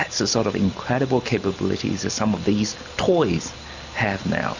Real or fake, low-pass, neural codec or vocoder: real; 7.2 kHz; none